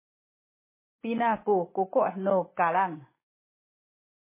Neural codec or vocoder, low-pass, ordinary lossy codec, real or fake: none; 3.6 kHz; MP3, 16 kbps; real